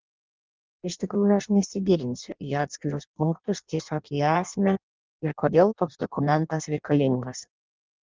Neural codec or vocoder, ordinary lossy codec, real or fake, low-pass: codec, 16 kHz in and 24 kHz out, 0.6 kbps, FireRedTTS-2 codec; Opus, 24 kbps; fake; 7.2 kHz